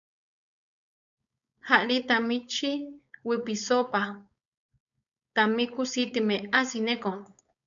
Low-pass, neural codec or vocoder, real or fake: 7.2 kHz; codec, 16 kHz, 4.8 kbps, FACodec; fake